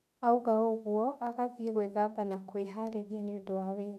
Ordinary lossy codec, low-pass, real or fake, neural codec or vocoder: none; 14.4 kHz; fake; autoencoder, 48 kHz, 32 numbers a frame, DAC-VAE, trained on Japanese speech